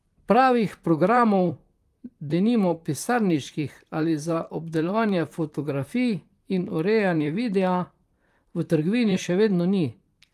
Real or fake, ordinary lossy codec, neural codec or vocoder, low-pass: fake; Opus, 32 kbps; vocoder, 44.1 kHz, 128 mel bands, Pupu-Vocoder; 14.4 kHz